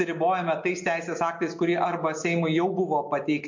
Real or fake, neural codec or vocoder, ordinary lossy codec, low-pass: real; none; MP3, 48 kbps; 7.2 kHz